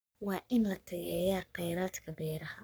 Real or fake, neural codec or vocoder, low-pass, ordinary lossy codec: fake; codec, 44.1 kHz, 3.4 kbps, Pupu-Codec; none; none